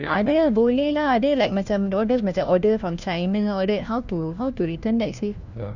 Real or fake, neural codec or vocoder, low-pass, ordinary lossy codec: fake; codec, 16 kHz, 1 kbps, FunCodec, trained on LibriTTS, 50 frames a second; 7.2 kHz; none